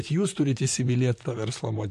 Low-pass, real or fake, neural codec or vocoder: 14.4 kHz; fake; vocoder, 44.1 kHz, 128 mel bands, Pupu-Vocoder